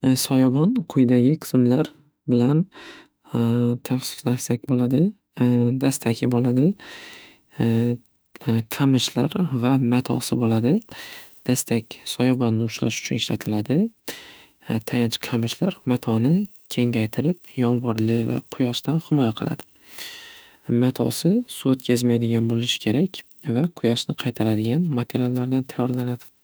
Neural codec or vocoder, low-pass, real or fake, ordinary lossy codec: autoencoder, 48 kHz, 32 numbers a frame, DAC-VAE, trained on Japanese speech; none; fake; none